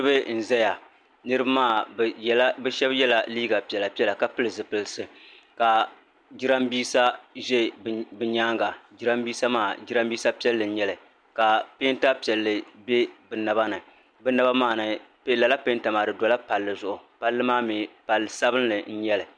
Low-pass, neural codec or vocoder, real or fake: 9.9 kHz; none; real